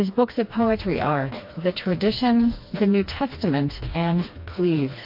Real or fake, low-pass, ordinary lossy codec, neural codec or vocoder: fake; 5.4 kHz; MP3, 32 kbps; codec, 16 kHz, 2 kbps, FreqCodec, smaller model